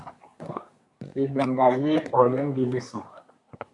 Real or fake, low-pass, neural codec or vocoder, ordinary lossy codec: fake; 10.8 kHz; codec, 24 kHz, 1 kbps, SNAC; AAC, 64 kbps